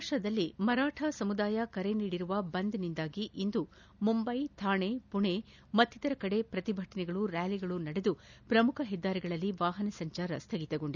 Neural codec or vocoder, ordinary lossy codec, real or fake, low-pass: none; none; real; 7.2 kHz